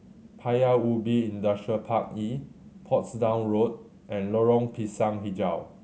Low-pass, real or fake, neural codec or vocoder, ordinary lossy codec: none; real; none; none